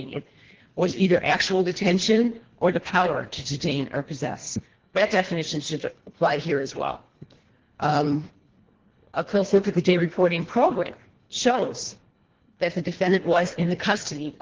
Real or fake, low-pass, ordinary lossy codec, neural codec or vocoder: fake; 7.2 kHz; Opus, 16 kbps; codec, 24 kHz, 1.5 kbps, HILCodec